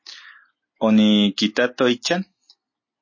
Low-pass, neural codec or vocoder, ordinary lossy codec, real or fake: 7.2 kHz; none; MP3, 32 kbps; real